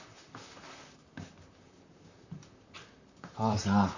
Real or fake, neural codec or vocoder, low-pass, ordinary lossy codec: fake; vocoder, 44.1 kHz, 128 mel bands, Pupu-Vocoder; 7.2 kHz; none